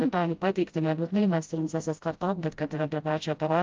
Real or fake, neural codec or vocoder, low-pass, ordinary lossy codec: fake; codec, 16 kHz, 0.5 kbps, FreqCodec, smaller model; 7.2 kHz; Opus, 24 kbps